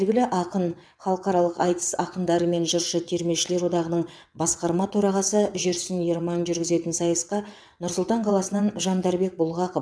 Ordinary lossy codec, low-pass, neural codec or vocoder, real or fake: none; none; vocoder, 22.05 kHz, 80 mel bands, WaveNeXt; fake